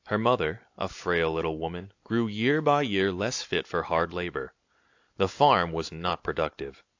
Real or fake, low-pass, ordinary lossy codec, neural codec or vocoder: real; 7.2 kHz; AAC, 48 kbps; none